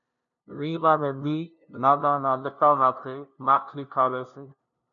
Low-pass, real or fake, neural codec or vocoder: 7.2 kHz; fake; codec, 16 kHz, 0.5 kbps, FunCodec, trained on LibriTTS, 25 frames a second